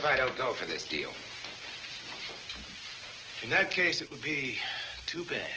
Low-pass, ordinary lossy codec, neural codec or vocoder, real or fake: 7.2 kHz; Opus, 16 kbps; none; real